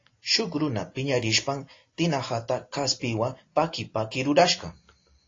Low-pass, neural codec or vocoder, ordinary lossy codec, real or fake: 7.2 kHz; none; AAC, 32 kbps; real